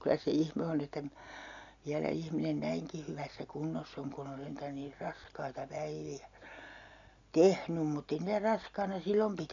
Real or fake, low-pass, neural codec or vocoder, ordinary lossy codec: real; 7.2 kHz; none; none